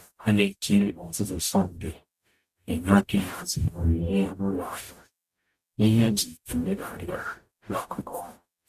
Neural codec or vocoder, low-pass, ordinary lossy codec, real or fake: codec, 44.1 kHz, 0.9 kbps, DAC; 14.4 kHz; none; fake